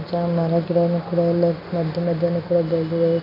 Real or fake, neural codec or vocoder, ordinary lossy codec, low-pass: real; none; MP3, 48 kbps; 5.4 kHz